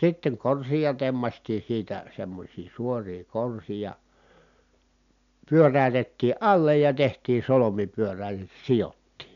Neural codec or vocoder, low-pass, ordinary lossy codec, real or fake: none; 7.2 kHz; none; real